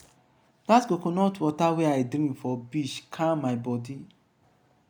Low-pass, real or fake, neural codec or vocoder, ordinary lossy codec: none; real; none; none